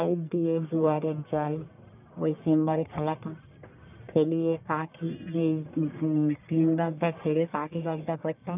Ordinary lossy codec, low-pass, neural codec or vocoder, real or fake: none; 3.6 kHz; codec, 44.1 kHz, 1.7 kbps, Pupu-Codec; fake